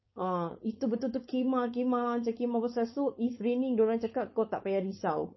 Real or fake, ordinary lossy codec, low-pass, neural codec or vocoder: fake; MP3, 24 kbps; 7.2 kHz; codec, 16 kHz, 4.8 kbps, FACodec